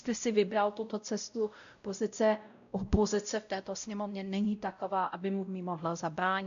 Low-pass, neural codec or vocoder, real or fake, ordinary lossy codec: 7.2 kHz; codec, 16 kHz, 0.5 kbps, X-Codec, WavLM features, trained on Multilingual LibriSpeech; fake; AAC, 96 kbps